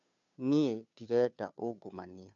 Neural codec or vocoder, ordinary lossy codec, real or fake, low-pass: codec, 16 kHz, 2 kbps, FunCodec, trained on Chinese and English, 25 frames a second; MP3, 64 kbps; fake; 7.2 kHz